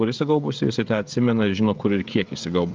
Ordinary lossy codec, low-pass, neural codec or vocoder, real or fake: Opus, 24 kbps; 7.2 kHz; codec, 16 kHz, 16 kbps, FreqCodec, smaller model; fake